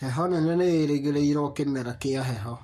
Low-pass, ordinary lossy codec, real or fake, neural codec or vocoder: 14.4 kHz; MP3, 64 kbps; fake; codec, 44.1 kHz, 7.8 kbps, Pupu-Codec